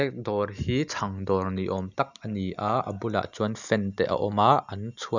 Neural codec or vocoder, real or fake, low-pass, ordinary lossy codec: none; real; 7.2 kHz; none